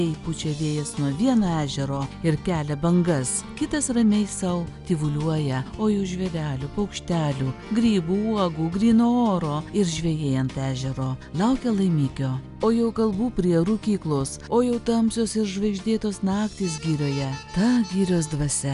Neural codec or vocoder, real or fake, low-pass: none; real; 10.8 kHz